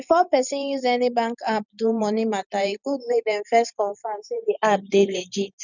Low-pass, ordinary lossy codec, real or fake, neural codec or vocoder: 7.2 kHz; none; fake; vocoder, 44.1 kHz, 128 mel bands every 512 samples, BigVGAN v2